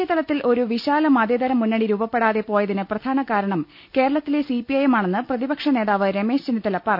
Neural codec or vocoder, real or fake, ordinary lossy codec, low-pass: none; real; none; 5.4 kHz